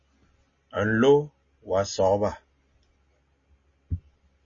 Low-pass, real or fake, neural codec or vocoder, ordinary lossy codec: 7.2 kHz; real; none; MP3, 32 kbps